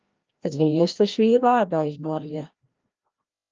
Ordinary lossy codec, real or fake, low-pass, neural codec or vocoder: Opus, 24 kbps; fake; 7.2 kHz; codec, 16 kHz, 1 kbps, FreqCodec, larger model